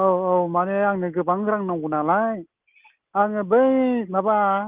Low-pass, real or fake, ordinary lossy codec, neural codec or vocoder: 3.6 kHz; real; Opus, 32 kbps; none